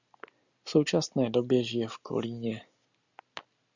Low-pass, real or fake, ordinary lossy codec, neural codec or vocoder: 7.2 kHz; real; Opus, 64 kbps; none